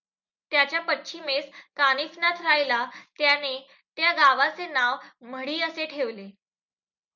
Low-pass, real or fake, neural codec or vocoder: 7.2 kHz; real; none